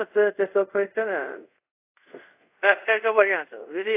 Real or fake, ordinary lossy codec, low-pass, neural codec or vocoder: fake; AAC, 24 kbps; 3.6 kHz; codec, 24 kHz, 0.5 kbps, DualCodec